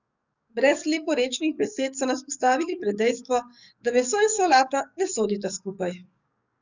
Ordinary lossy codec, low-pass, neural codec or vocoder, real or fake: none; 7.2 kHz; codec, 44.1 kHz, 7.8 kbps, DAC; fake